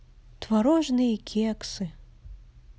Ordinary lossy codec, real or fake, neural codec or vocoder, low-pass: none; real; none; none